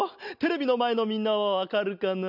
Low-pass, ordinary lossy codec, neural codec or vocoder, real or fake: 5.4 kHz; none; none; real